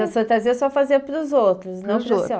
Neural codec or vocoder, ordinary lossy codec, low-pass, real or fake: none; none; none; real